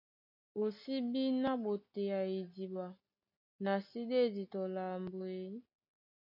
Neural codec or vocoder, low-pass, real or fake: none; 5.4 kHz; real